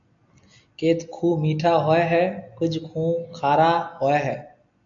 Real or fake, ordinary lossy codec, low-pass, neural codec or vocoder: real; AAC, 64 kbps; 7.2 kHz; none